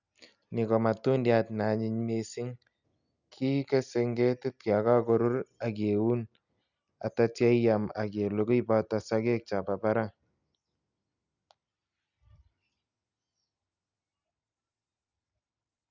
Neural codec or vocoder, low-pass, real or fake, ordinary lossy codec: none; 7.2 kHz; real; none